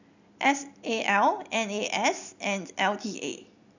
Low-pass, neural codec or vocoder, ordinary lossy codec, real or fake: 7.2 kHz; none; none; real